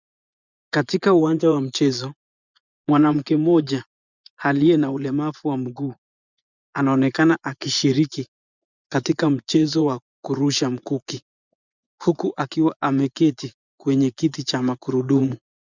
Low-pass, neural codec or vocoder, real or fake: 7.2 kHz; vocoder, 24 kHz, 100 mel bands, Vocos; fake